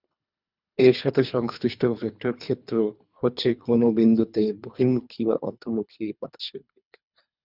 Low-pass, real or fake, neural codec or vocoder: 5.4 kHz; fake; codec, 24 kHz, 3 kbps, HILCodec